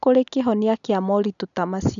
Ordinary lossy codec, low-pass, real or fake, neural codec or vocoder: none; 7.2 kHz; real; none